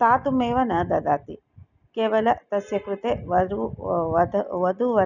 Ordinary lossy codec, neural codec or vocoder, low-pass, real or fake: none; none; 7.2 kHz; real